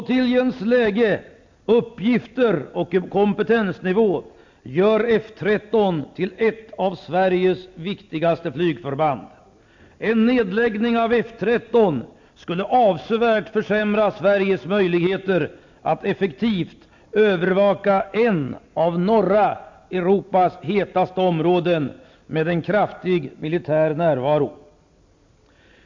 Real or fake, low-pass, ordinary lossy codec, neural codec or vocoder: real; 7.2 kHz; MP3, 48 kbps; none